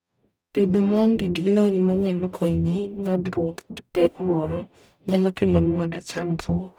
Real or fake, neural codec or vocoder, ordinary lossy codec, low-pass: fake; codec, 44.1 kHz, 0.9 kbps, DAC; none; none